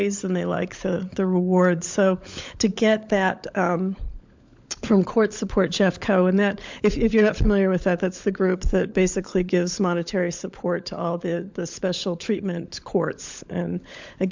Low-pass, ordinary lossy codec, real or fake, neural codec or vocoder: 7.2 kHz; MP3, 64 kbps; fake; codec, 16 kHz, 16 kbps, FunCodec, trained on LibriTTS, 50 frames a second